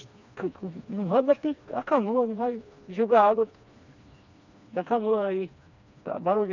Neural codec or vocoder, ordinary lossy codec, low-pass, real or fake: codec, 16 kHz, 2 kbps, FreqCodec, smaller model; none; 7.2 kHz; fake